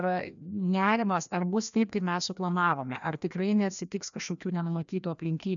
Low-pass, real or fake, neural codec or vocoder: 7.2 kHz; fake; codec, 16 kHz, 1 kbps, FreqCodec, larger model